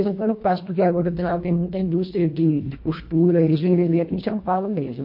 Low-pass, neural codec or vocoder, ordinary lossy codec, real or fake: 5.4 kHz; codec, 24 kHz, 1.5 kbps, HILCodec; MP3, 32 kbps; fake